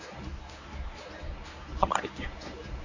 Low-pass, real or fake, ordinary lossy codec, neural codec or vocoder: 7.2 kHz; fake; none; codec, 24 kHz, 0.9 kbps, WavTokenizer, medium speech release version 1